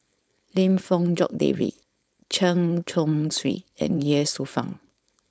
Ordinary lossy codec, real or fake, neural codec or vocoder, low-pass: none; fake; codec, 16 kHz, 4.8 kbps, FACodec; none